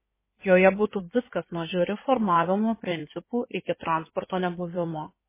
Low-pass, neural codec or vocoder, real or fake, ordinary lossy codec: 3.6 kHz; codec, 16 kHz in and 24 kHz out, 2.2 kbps, FireRedTTS-2 codec; fake; MP3, 16 kbps